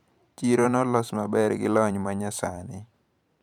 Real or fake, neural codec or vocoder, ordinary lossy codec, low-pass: fake; vocoder, 44.1 kHz, 128 mel bands every 512 samples, BigVGAN v2; none; 19.8 kHz